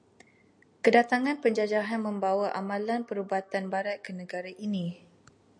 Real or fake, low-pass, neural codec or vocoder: real; 9.9 kHz; none